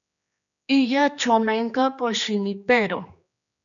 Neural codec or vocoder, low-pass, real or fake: codec, 16 kHz, 2 kbps, X-Codec, HuBERT features, trained on general audio; 7.2 kHz; fake